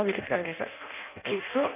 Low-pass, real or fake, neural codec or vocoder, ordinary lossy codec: 3.6 kHz; fake; codec, 16 kHz in and 24 kHz out, 0.6 kbps, FireRedTTS-2 codec; none